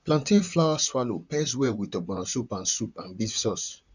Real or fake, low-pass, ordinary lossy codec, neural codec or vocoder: fake; 7.2 kHz; none; vocoder, 44.1 kHz, 128 mel bands, Pupu-Vocoder